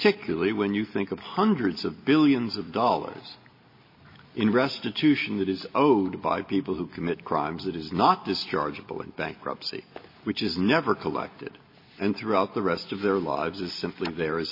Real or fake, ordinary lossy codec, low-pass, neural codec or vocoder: real; MP3, 24 kbps; 5.4 kHz; none